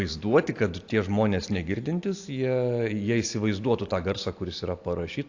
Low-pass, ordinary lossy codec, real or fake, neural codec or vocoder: 7.2 kHz; AAC, 48 kbps; real; none